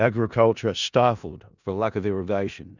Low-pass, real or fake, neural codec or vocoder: 7.2 kHz; fake; codec, 16 kHz in and 24 kHz out, 0.4 kbps, LongCat-Audio-Codec, four codebook decoder